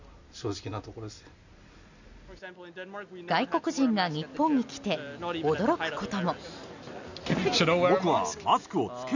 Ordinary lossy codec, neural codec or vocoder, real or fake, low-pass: none; none; real; 7.2 kHz